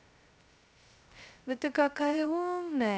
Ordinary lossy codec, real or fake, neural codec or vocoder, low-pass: none; fake; codec, 16 kHz, 0.2 kbps, FocalCodec; none